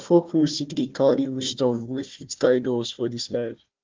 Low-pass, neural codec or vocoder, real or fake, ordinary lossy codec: 7.2 kHz; codec, 16 kHz, 1 kbps, FunCodec, trained on Chinese and English, 50 frames a second; fake; Opus, 32 kbps